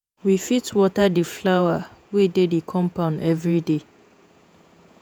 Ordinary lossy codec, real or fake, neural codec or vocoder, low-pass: none; fake; vocoder, 48 kHz, 128 mel bands, Vocos; none